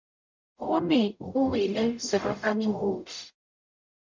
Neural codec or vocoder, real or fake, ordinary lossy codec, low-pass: codec, 44.1 kHz, 0.9 kbps, DAC; fake; MP3, 64 kbps; 7.2 kHz